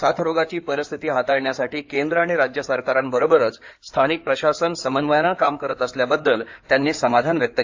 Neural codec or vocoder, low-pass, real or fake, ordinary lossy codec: codec, 16 kHz in and 24 kHz out, 2.2 kbps, FireRedTTS-2 codec; 7.2 kHz; fake; AAC, 48 kbps